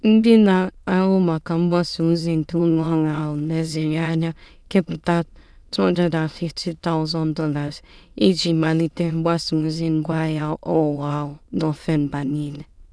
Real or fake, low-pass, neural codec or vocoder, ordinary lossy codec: fake; none; autoencoder, 22.05 kHz, a latent of 192 numbers a frame, VITS, trained on many speakers; none